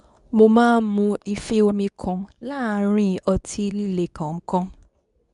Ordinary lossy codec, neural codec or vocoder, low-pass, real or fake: none; codec, 24 kHz, 0.9 kbps, WavTokenizer, medium speech release version 2; 10.8 kHz; fake